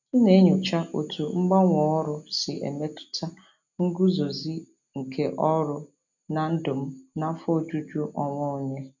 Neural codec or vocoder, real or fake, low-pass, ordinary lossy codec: none; real; 7.2 kHz; none